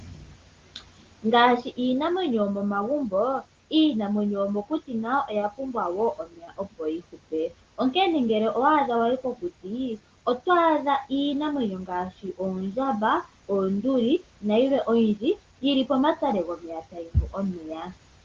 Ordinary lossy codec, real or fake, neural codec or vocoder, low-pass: Opus, 16 kbps; real; none; 7.2 kHz